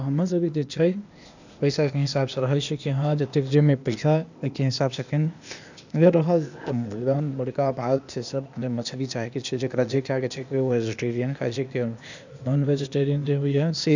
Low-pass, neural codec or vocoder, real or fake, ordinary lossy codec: 7.2 kHz; codec, 16 kHz, 0.8 kbps, ZipCodec; fake; none